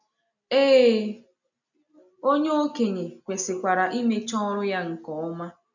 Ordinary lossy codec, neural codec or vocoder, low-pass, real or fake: none; none; 7.2 kHz; real